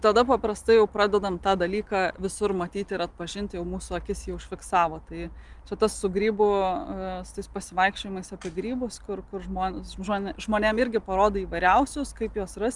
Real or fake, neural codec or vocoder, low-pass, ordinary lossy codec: real; none; 10.8 kHz; Opus, 32 kbps